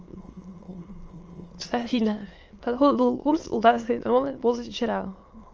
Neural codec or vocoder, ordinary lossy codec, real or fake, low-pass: autoencoder, 22.05 kHz, a latent of 192 numbers a frame, VITS, trained on many speakers; Opus, 24 kbps; fake; 7.2 kHz